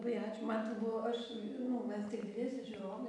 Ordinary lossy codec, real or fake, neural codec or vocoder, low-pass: AAC, 64 kbps; real; none; 10.8 kHz